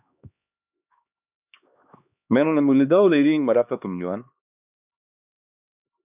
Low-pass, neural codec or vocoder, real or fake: 3.6 kHz; codec, 16 kHz, 2 kbps, X-Codec, HuBERT features, trained on LibriSpeech; fake